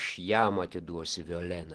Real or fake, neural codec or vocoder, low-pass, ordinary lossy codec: real; none; 10.8 kHz; Opus, 16 kbps